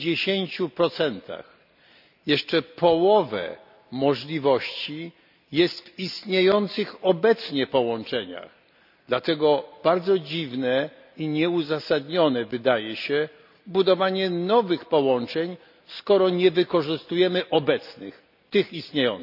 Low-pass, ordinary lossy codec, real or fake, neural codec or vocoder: 5.4 kHz; none; real; none